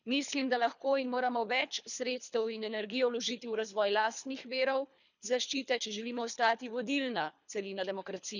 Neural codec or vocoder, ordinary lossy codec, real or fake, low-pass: codec, 24 kHz, 3 kbps, HILCodec; none; fake; 7.2 kHz